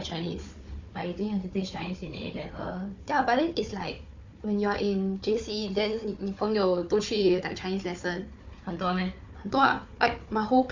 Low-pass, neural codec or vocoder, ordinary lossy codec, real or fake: 7.2 kHz; codec, 16 kHz, 4 kbps, FunCodec, trained on Chinese and English, 50 frames a second; MP3, 64 kbps; fake